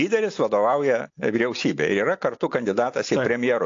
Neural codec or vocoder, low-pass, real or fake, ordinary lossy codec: none; 7.2 kHz; real; AAC, 48 kbps